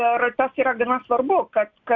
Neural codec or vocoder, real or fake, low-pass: none; real; 7.2 kHz